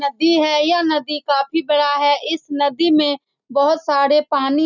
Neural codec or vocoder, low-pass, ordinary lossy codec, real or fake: none; 7.2 kHz; none; real